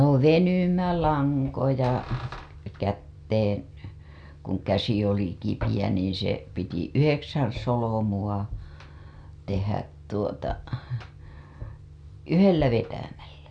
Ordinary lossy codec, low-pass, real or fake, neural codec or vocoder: none; 9.9 kHz; real; none